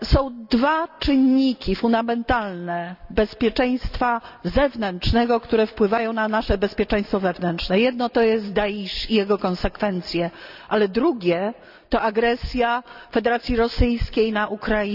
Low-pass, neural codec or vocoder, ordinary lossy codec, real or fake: 5.4 kHz; none; none; real